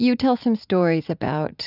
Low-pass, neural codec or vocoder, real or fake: 5.4 kHz; vocoder, 44.1 kHz, 80 mel bands, Vocos; fake